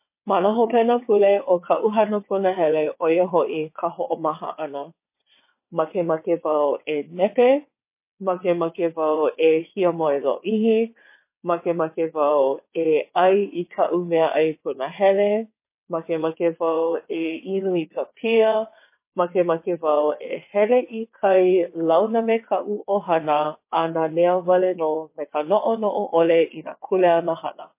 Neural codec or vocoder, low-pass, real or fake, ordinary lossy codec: vocoder, 22.05 kHz, 80 mel bands, WaveNeXt; 3.6 kHz; fake; MP3, 24 kbps